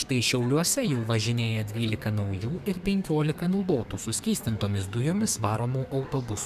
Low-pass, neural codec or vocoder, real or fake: 14.4 kHz; codec, 32 kHz, 1.9 kbps, SNAC; fake